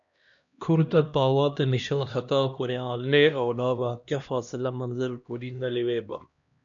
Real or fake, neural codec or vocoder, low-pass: fake; codec, 16 kHz, 1 kbps, X-Codec, HuBERT features, trained on LibriSpeech; 7.2 kHz